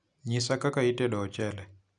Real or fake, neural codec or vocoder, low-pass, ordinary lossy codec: real; none; 9.9 kHz; none